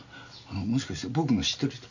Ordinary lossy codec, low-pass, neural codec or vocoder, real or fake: none; 7.2 kHz; none; real